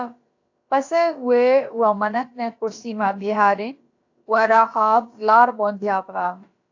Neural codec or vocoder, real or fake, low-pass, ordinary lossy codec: codec, 16 kHz, about 1 kbps, DyCAST, with the encoder's durations; fake; 7.2 kHz; AAC, 48 kbps